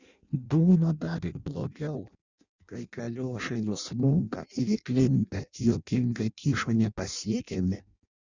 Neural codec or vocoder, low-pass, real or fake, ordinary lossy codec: codec, 16 kHz in and 24 kHz out, 0.6 kbps, FireRedTTS-2 codec; 7.2 kHz; fake; Opus, 64 kbps